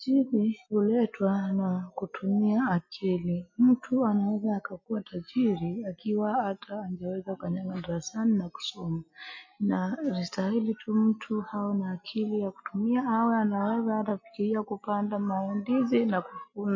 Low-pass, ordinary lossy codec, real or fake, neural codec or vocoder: 7.2 kHz; MP3, 32 kbps; real; none